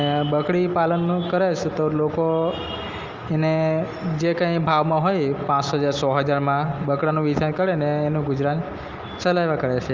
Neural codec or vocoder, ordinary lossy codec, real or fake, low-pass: none; Opus, 32 kbps; real; 7.2 kHz